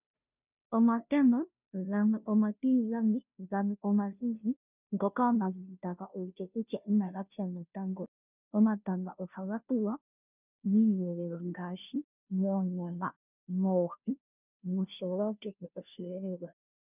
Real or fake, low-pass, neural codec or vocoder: fake; 3.6 kHz; codec, 16 kHz, 0.5 kbps, FunCodec, trained on Chinese and English, 25 frames a second